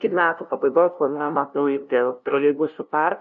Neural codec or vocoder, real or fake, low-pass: codec, 16 kHz, 0.5 kbps, FunCodec, trained on LibriTTS, 25 frames a second; fake; 7.2 kHz